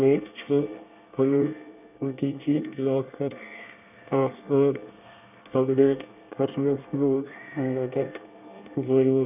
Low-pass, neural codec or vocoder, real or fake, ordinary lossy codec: 3.6 kHz; codec, 24 kHz, 1 kbps, SNAC; fake; none